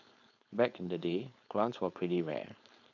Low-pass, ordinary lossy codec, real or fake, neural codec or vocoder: 7.2 kHz; none; fake; codec, 16 kHz, 4.8 kbps, FACodec